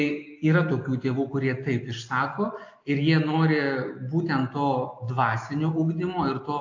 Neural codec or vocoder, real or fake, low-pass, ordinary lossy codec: none; real; 7.2 kHz; AAC, 48 kbps